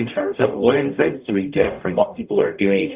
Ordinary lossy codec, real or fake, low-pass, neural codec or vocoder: Opus, 64 kbps; fake; 3.6 kHz; codec, 44.1 kHz, 0.9 kbps, DAC